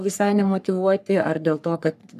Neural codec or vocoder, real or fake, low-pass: codec, 44.1 kHz, 3.4 kbps, Pupu-Codec; fake; 14.4 kHz